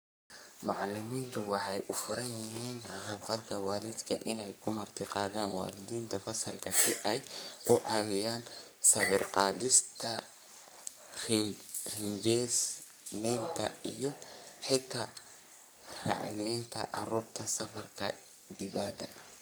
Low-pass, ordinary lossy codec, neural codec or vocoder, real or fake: none; none; codec, 44.1 kHz, 3.4 kbps, Pupu-Codec; fake